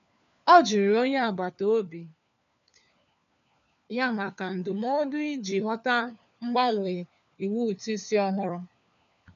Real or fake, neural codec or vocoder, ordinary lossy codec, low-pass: fake; codec, 16 kHz, 4 kbps, FunCodec, trained on LibriTTS, 50 frames a second; none; 7.2 kHz